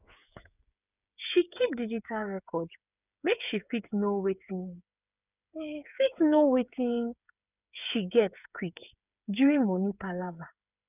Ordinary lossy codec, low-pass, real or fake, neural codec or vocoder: none; 3.6 kHz; fake; codec, 16 kHz, 8 kbps, FreqCodec, smaller model